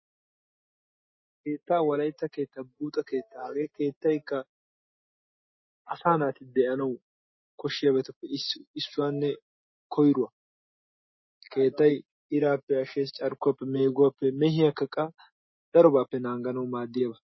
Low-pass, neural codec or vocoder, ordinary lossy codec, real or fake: 7.2 kHz; none; MP3, 24 kbps; real